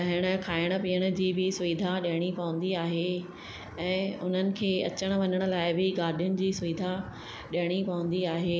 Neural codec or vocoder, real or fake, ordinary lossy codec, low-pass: none; real; none; none